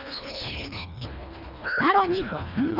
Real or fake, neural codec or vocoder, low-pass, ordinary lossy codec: fake; codec, 24 kHz, 1.5 kbps, HILCodec; 5.4 kHz; none